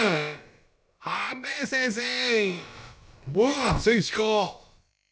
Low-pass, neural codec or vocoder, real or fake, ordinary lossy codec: none; codec, 16 kHz, about 1 kbps, DyCAST, with the encoder's durations; fake; none